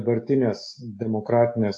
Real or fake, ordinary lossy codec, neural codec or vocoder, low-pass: real; AAC, 48 kbps; none; 10.8 kHz